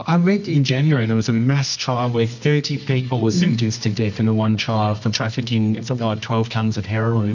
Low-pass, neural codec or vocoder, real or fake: 7.2 kHz; codec, 24 kHz, 0.9 kbps, WavTokenizer, medium music audio release; fake